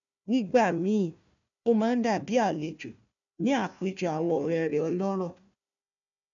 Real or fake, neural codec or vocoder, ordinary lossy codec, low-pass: fake; codec, 16 kHz, 1 kbps, FunCodec, trained on Chinese and English, 50 frames a second; none; 7.2 kHz